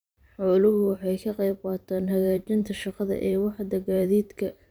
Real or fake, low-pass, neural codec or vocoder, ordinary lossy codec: fake; none; vocoder, 44.1 kHz, 128 mel bands every 512 samples, BigVGAN v2; none